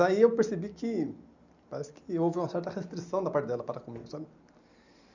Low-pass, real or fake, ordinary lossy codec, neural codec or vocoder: 7.2 kHz; real; none; none